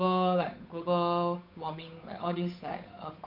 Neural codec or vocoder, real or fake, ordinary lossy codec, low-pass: codec, 16 kHz, 8 kbps, FunCodec, trained on Chinese and English, 25 frames a second; fake; none; 5.4 kHz